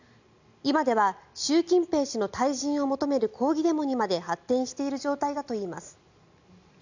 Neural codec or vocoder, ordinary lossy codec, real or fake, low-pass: none; none; real; 7.2 kHz